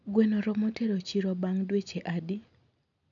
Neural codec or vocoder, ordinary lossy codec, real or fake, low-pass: none; none; real; 7.2 kHz